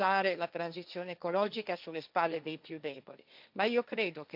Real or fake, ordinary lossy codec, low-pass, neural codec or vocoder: fake; none; 5.4 kHz; codec, 16 kHz, 1.1 kbps, Voila-Tokenizer